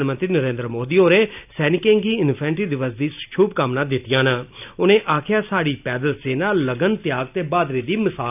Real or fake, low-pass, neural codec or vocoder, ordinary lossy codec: real; 3.6 kHz; none; none